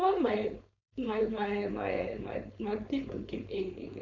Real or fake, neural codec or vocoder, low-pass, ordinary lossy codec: fake; codec, 16 kHz, 4.8 kbps, FACodec; 7.2 kHz; none